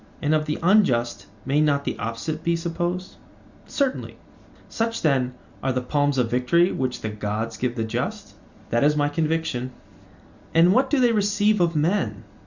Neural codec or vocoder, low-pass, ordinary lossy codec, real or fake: none; 7.2 kHz; Opus, 64 kbps; real